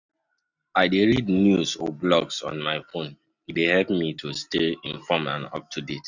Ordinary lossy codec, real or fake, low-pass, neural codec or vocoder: none; real; 7.2 kHz; none